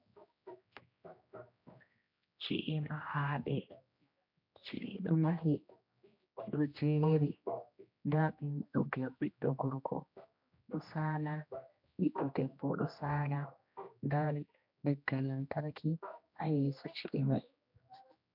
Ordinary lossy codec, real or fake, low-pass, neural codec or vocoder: MP3, 48 kbps; fake; 5.4 kHz; codec, 16 kHz, 1 kbps, X-Codec, HuBERT features, trained on general audio